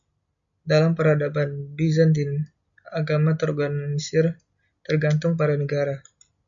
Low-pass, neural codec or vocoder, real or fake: 7.2 kHz; none; real